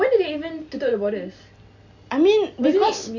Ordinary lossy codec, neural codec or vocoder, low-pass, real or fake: none; none; 7.2 kHz; real